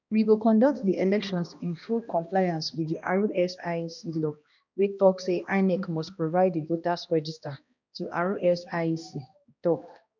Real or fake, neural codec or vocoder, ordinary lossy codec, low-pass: fake; codec, 16 kHz, 1 kbps, X-Codec, HuBERT features, trained on balanced general audio; none; 7.2 kHz